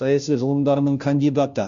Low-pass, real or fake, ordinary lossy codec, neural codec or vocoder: 7.2 kHz; fake; MP3, 64 kbps; codec, 16 kHz, 0.5 kbps, FunCodec, trained on Chinese and English, 25 frames a second